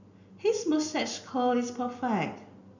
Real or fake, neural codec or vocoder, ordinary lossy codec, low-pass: real; none; none; 7.2 kHz